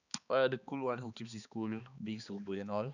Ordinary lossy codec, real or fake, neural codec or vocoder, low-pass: none; fake; codec, 16 kHz, 2 kbps, X-Codec, HuBERT features, trained on balanced general audio; 7.2 kHz